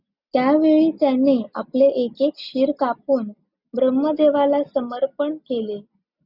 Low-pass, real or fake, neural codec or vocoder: 5.4 kHz; real; none